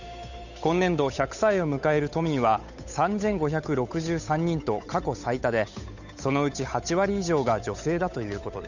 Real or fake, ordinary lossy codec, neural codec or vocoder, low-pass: fake; none; codec, 16 kHz, 8 kbps, FunCodec, trained on Chinese and English, 25 frames a second; 7.2 kHz